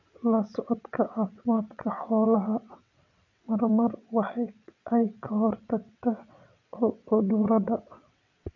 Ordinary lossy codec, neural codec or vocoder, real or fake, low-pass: MP3, 48 kbps; vocoder, 22.05 kHz, 80 mel bands, WaveNeXt; fake; 7.2 kHz